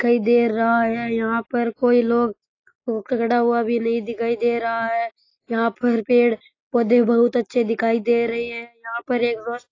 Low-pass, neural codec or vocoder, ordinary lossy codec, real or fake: 7.2 kHz; none; AAC, 32 kbps; real